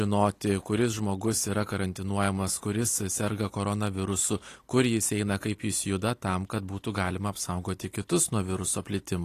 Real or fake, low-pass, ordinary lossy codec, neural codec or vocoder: real; 14.4 kHz; AAC, 48 kbps; none